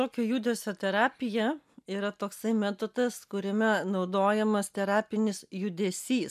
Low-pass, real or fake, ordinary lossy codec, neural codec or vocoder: 14.4 kHz; real; MP3, 96 kbps; none